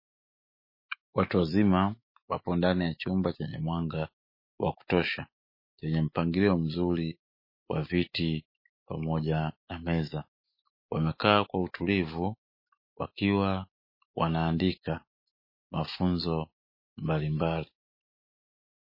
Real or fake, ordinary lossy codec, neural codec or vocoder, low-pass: fake; MP3, 24 kbps; autoencoder, 48 kHz, 128 numbers a frame, DAC-VAE, trained on Japanese speech; 5.4 kHz